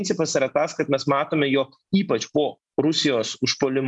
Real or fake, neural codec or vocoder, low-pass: real; none; 10.8 kHz